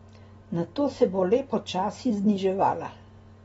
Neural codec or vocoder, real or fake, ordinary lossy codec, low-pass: none; real; AAC, 24 kbps; 19.8 kHz